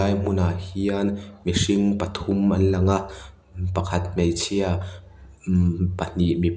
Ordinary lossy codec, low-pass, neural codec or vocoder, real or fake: none; none; none; real